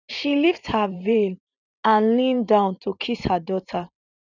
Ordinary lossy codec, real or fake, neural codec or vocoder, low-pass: none; fake; vocoder, 44.1 kHz, 128 mel bands every 512 samples, BigVGAN v2; 7.2 kHz